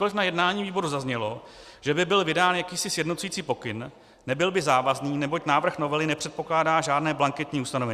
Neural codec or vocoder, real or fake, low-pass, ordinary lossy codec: none; real; 14.4 kHz; Opus, 64 kbps